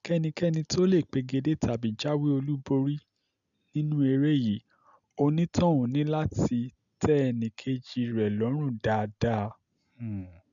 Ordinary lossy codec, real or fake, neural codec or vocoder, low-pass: none; real; none; 7.2 kHz